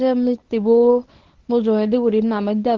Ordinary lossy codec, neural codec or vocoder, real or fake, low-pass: Opus, 16 kbps; codec, 24 kHz, 0.9 kbps, WavTokenizer, medium speech release version 1; fake; 7.2 kHz